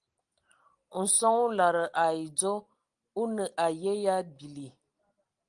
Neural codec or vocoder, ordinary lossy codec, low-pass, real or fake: none; Opus, 24 kbps; 9.9 kHz; real